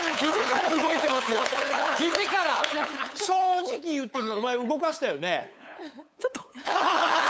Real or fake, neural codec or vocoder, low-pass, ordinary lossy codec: fake; codec, 16 kHz, 8 kbps, FunCodec, trained on LibriTTS, 25 frames a second; none; none